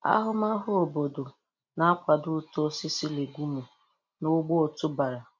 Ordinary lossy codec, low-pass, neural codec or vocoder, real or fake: MP3, 48 kbps; 7.2 kHz; none; real